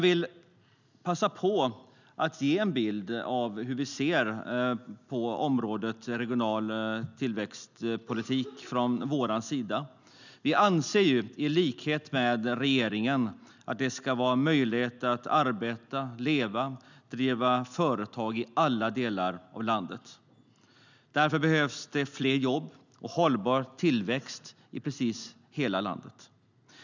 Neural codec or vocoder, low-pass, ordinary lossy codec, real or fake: none; 7.2 kHz; none; real